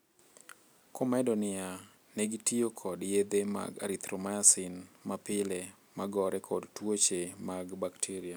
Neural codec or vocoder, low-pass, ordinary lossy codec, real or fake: none; none; none; real